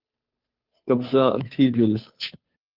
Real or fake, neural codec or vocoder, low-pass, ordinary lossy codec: fake; codec, 16 kHz, 2 kbps, FunCodec, trained on Chinese and English, 25 frames a second; 5.4 kHz; Opus, 24 kbps